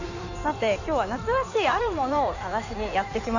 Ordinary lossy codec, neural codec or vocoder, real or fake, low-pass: none; codec, 16 kHz in and 24 kHz out, 2.2 kbps, FireRedTTS-2 codec; fake; 7.2 kHz